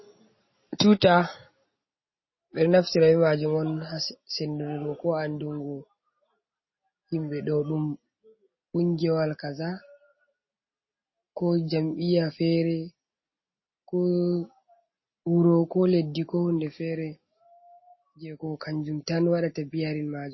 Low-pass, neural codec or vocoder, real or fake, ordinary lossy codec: 7.2 kHz; none; real; MP3, 24 kbps